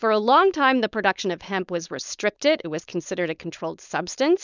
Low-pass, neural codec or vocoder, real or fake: 7.2 kHz; codec, 16 kHz, 4.8 kbps, FACodec; fake